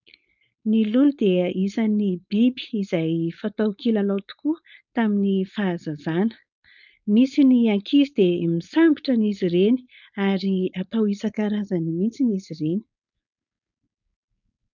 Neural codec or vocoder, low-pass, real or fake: codec, 16 kHz, 4.8 kbps, FACodec; 7.2 kHz; fake